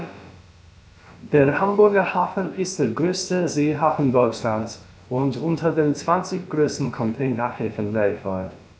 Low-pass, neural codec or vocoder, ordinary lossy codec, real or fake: none; codec, 16 kHz, about 1 kbps, DyCAST, with the encoder's durations; none; fake